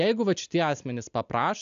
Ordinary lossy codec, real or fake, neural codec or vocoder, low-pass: AAC, 96 kbps; real; none; 7.2 kHz